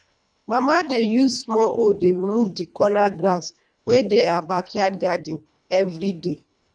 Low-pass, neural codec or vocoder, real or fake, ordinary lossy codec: 9.9 kHz; codec, 24 kHz, 1.5 kbps, HILCodec; fake; none